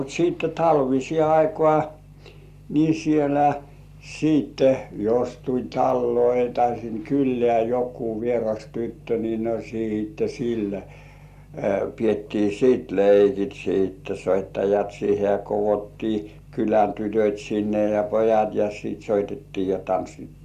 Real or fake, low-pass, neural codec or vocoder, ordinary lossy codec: real; 14.4 kHz; none; Opus, 64 kbps